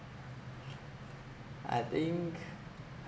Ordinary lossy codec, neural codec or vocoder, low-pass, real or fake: none; none; none; real